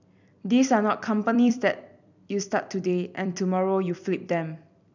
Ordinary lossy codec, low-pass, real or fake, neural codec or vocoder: none; 7.2 kHz; fake; vocoder, 44.1 kHz, 128 mel bands every 256 samples, BigVGAN v2